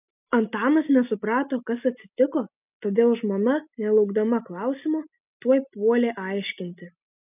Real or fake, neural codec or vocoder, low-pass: real; none; 3.6 kHz